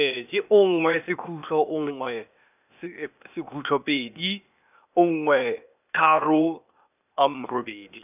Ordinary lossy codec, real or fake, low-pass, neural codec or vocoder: none; fake; 3.6 kHz; codec, 16 kHz, 0.8 kbps, ZipCodec